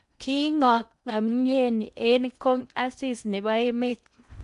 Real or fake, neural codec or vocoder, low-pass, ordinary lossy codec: fake; codec, 16 kHz in and 24 kHz out, 0.8 kbps, FocalCodec, streaming, 65536 codes; 10.8 kHz; none